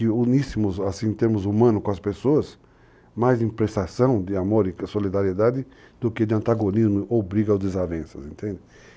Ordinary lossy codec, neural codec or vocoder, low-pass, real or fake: none; none; none; real